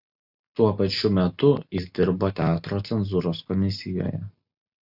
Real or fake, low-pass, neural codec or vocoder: real; 5.4 kHz; none